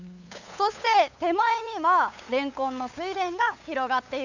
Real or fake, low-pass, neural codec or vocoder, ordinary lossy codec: fake; 7.2 kHz; codec, 16 kHz, 8 kbps, FunCodec, trained on LibriTTS, 25 frames a second; none